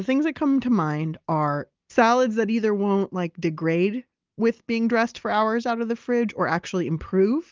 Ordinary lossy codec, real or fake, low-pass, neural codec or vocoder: Opus, 24 kbps; real; 7.2 kHz; none